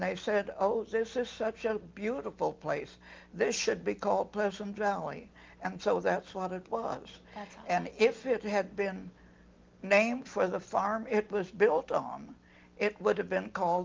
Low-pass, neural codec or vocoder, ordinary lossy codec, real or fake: 7.2 kHz; none; Opus, 32 kbps; real